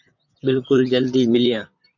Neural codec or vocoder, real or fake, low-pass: vocoder, 44.1 kHz, 128 mel bands, Pupu-Vocoder; fake; 7.2 kHz